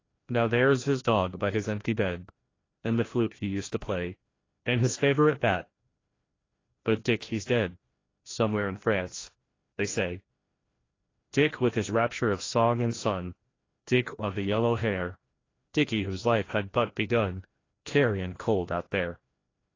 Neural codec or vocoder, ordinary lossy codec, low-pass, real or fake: codec, 16 kHz, 1 kbps, FreqCodec, larger model; AAC, 32 kbps; 7.2 kHz; fake